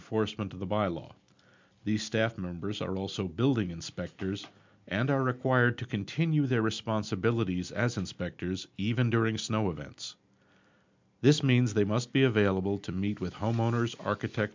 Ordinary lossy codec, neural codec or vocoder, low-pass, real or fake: MP3, 64 kbps; none; 7.2 kHz; real